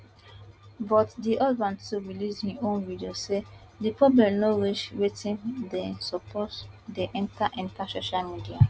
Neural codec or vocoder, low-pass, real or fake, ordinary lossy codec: none; none; real; none